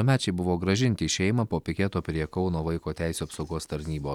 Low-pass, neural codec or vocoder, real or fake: 19.8 kHz; none; real